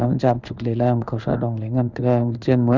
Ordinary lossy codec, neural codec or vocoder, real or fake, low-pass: none; codec, 16 kHz in and 24 kHz out, 1 kbps, XY-Tokenizer; fake; 7.2 kHz